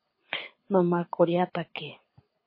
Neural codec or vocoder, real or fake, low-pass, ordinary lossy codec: codec, 24 kHz, 6 kbps, HILCodec; fake; 5.4 kHz; MP3, 24 kbps